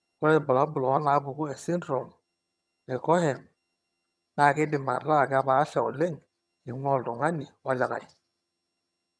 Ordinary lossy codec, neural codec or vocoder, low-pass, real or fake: none; vocoder, 22.05 kHz, 80 mel bands, HiFi-GAN; none; fake